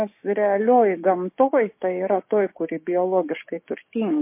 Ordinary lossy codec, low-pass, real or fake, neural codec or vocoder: MP3, 24 kbps; 3.6 kHz; fake; codec, 16 kHz, 6 kbps, DAC